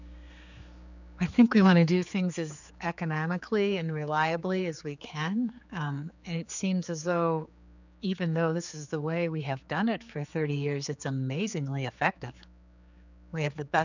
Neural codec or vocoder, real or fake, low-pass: codec, 16 kHz, 4 kbps, X-Codec, HuBERT features, trained on general audio; fake; 7.2 kHz